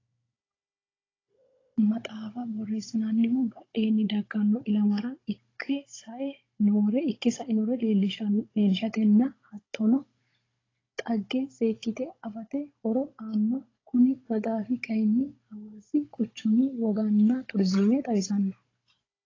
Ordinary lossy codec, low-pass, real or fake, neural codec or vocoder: AAC, 32 kbps; 7.2 kHz; fake; codec, 16 kHz, 16 kbps, FunCodec, trained on Chinese and English, 50 frames a second